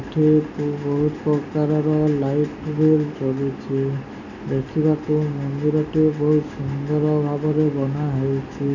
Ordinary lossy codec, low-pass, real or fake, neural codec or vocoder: none; 7.2 kHz; real; none